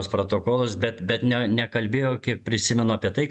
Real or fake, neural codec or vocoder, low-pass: fake; vocoder, 48 kHz, 128 mel bands, Vocos; 10.8 kHz